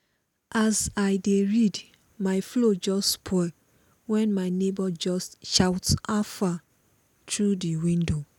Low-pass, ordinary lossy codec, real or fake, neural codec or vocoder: 19.8 kHz; none; real; none